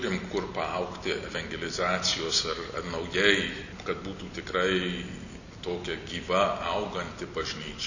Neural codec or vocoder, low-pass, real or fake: none; 7.2 kHz; real